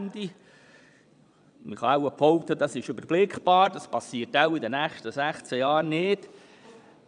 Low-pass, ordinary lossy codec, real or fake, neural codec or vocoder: 9.9 kHz; none; fake; vocoder, 22.05 kHz, 80 mel bands, Vocos